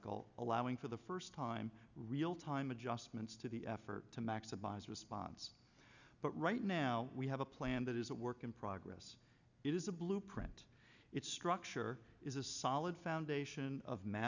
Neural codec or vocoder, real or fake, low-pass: none; real; 7.2 kHz